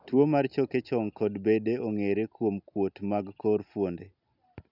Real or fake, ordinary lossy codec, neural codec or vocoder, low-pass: real; none; none; 5.4 kHz